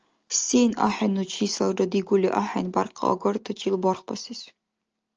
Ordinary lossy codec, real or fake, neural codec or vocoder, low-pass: Opus, 24 kbps; real; none; 7.2 kHz